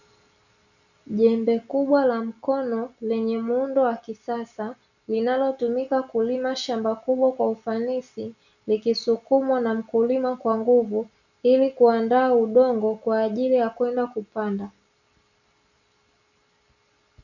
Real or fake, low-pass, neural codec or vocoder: real; 7.2 kHz; none